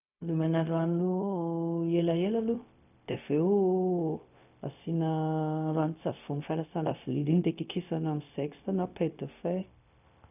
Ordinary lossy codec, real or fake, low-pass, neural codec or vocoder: none; fake; 3.6 kHz; codec, 16 kHz, 0.4 kbps, LongCat-Audio-Codec